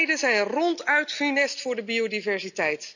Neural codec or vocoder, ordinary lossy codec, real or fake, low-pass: none; none; real; 7.2 kHz